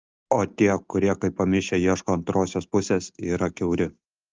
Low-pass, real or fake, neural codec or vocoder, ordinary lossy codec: 7.2 kHz; real; none; Opus, 32 kbps